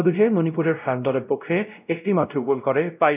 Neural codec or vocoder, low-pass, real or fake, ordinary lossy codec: codec, 16 kHz, 0.5 kbps, X-Codec, WavLM features, trained on Multilingual LibriSpeech; 3.6 kHz; fake; none